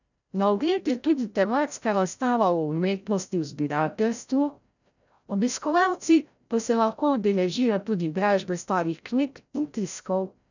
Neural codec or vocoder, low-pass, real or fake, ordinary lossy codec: codec, 16 kHz, 0.5 kbps, FreqCodec, larger model; 7.2 kHz; fake; none